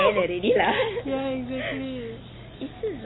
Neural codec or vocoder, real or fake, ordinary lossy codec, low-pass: none; real; AAC, 16 kbps; 7.2 kHz